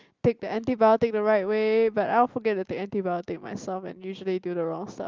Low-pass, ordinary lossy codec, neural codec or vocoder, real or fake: 7.2 kHz; Opus, 32 kbps; none; real